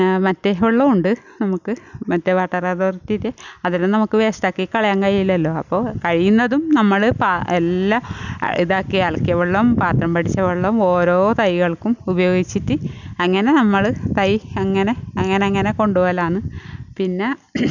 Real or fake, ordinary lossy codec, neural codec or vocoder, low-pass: real; none; none; 7.2 kHz